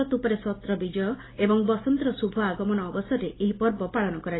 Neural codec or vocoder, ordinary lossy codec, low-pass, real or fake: none; AAC, 16 kbps; 7.2 kHz; real